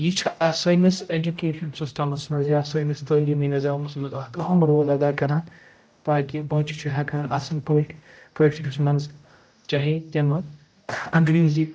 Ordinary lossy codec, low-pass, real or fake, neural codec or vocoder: none; none; fake; codec, 16 kHz, 0.5 kbps, X-Codec, HuBERT features, trained on general audio